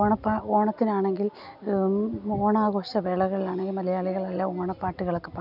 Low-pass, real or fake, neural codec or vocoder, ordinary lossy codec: 5.4 kHz; real; none; none